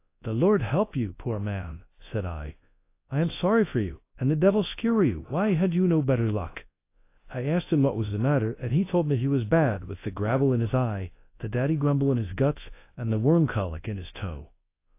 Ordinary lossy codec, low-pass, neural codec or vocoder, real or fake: AAC, 24 kbps; 3.6 kHz; codec, 24 kHz, 0.9 kbps, WavTokenizer, large speech release; fake